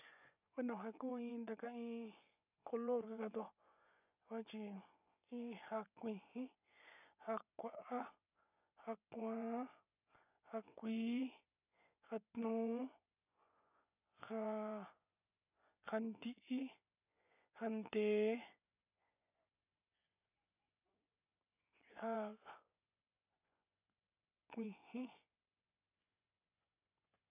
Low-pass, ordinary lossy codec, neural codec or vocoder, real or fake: 3.6 kHz; none; vocoder, 24 kHz, 100 mel bands, Vocos; fake